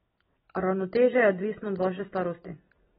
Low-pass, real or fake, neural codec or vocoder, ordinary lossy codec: 9.9 kHz; real; none; AAC, 16 kbps